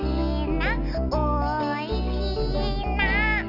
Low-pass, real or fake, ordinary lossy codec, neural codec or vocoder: 5.4 kHz; real; none; none